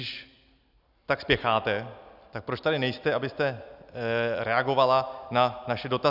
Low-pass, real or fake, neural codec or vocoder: 5.4 kHz; real; none